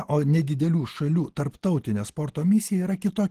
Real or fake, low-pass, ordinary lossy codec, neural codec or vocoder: real; 14.4 kHz; Opus, 16 kbps; none